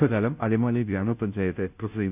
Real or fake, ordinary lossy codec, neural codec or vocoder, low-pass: fake; none; codec, 16 kHz, 0.5 kbps, FunCodec, trained on Chinese and English, 25 frames a second; 3.6 kHz